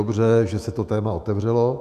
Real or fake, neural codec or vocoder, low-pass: fake; autoencoder, 48 kHz, 128 numbers a frame, DAC-VAE, trained on Japanese speech; 14.4 kHz